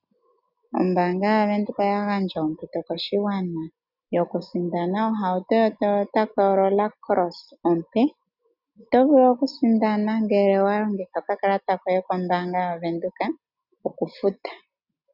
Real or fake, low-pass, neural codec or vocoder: real; 5.4 kHz; none